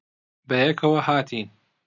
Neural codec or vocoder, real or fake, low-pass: none; real; 7.2 kHz